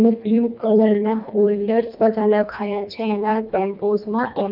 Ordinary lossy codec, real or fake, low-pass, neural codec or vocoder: none; fake; 5.4 kHz; codec, 24 kHz, 1.5 kbps, HILCodec